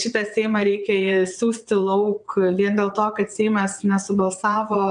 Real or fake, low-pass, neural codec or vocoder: fake; 9.9 kHz; vocoder, 22.05 kHz, 80 mel bands, WaveNeXt